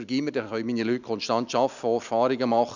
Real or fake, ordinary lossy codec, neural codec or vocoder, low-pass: real; none; none; 7.2 kHz